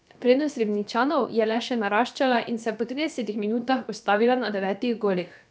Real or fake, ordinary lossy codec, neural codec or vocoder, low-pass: fake; none; codec, 16 kHz, about 1 kbps, DyCAST, with the encoder's durations; none